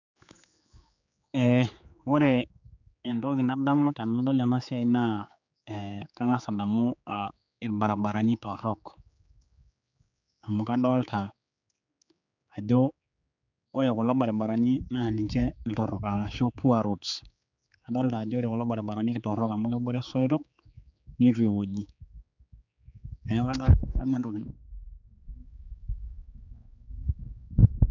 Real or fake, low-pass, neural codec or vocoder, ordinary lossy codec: fake; 7.2 kHz; codec, 16 kHz, 4 kbps, X-Codec, HuBERT features, trained on general audio; none